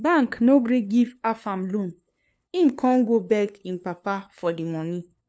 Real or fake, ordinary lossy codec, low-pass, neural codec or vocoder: fake; none; none; codec, 16 kHz, 2 kbps, FunCodec, trained on LibriTTS, 25 frames a second